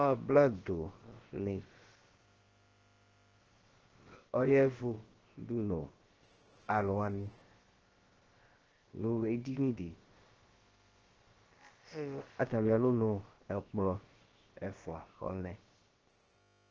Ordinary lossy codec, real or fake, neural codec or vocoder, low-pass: Opus, 16 kbps; fake; codec, 16 kHz, about 1 kbps, DyCAST, with the encoder's durations; 7.2 kHz